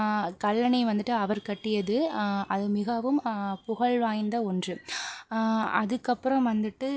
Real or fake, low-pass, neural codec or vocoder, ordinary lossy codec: real; none; none; none